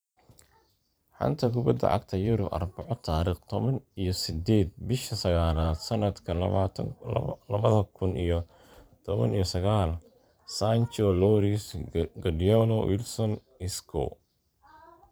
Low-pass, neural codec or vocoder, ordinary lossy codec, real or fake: none; vocoder, 44.1 kHz, 128 mel bands every 512 samples, BigVGAN v2; none; fake